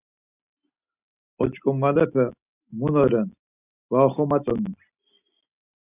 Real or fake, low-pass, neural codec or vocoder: real; 3.6 kHz; none